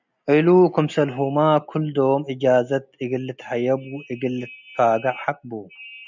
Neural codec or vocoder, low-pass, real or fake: none; 7.2 kHz; real